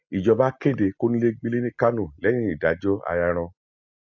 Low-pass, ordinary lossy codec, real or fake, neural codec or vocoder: 7.2 kHz; none; real; none